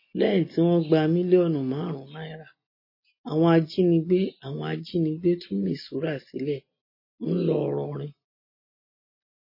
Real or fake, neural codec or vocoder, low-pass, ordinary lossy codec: real; none; 5.4 kHz; MP3, 24 kbps